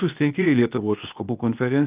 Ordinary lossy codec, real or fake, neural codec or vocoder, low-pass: Opus, 24 kbps; fake; codec, 16 kHz, 0.8 kbps, ZipCodec; 3.6 kHz